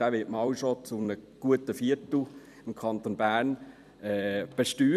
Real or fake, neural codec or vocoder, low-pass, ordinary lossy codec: fake; vocoder, 44.1 kHz, 128 mel bands every 256 samples, BigVGAN v2; 14.4 kHz; none